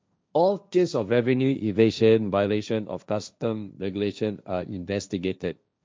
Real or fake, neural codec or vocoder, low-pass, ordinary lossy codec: fake; codec, 16 kHz, 1.1 kbps, Voila-Tokenizer; none; none